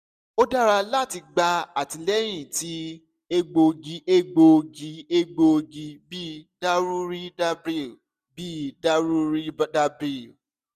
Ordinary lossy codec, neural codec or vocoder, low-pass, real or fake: none; none; 14.4 kHz; real